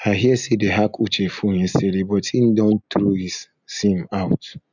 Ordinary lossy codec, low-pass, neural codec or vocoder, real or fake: none; 7.2 kHz; none; real